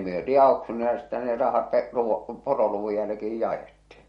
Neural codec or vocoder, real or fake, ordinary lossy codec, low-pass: none; real; MP3, 48 kbps; 19.8 kHz